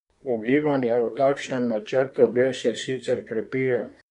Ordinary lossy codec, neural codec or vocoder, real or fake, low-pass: none; codec, 24 kHz, 1 kbps, SNAC; fake; 10.8 kHz